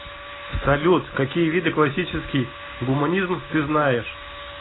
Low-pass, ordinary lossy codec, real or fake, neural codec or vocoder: 7.2 kHz; AAC, 16 kbps; real; none